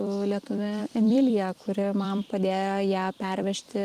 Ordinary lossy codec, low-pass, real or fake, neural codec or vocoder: Opus, 24 kbps; 14.4 kHz; fake; vocoder, 44.1 kHz, 128 mel bands every 256 samples, BigVGAN v2